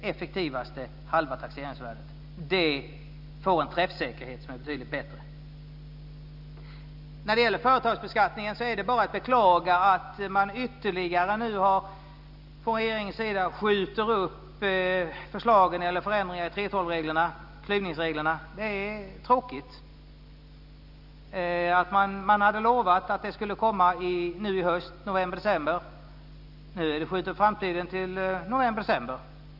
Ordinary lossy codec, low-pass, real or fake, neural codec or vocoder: none; 5.4 kHz; real; none